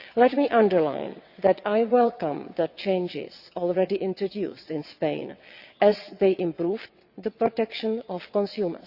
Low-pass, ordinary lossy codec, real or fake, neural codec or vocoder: 5.4 kHz; Opus, 64 kbps; fake; vocoder, 22.05 kHz, 80 mel bands, WaveNeXt